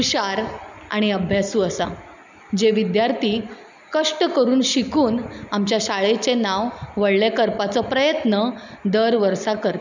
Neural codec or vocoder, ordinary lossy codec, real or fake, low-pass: none; none; real; 7.2 kHz